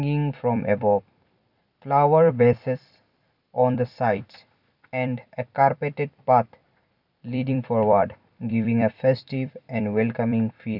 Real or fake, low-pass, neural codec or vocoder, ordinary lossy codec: fake; 5.4 kHz; vocoder, 44.1 kHz, 128 mel bands every 256 samples, BigVGAN v2; none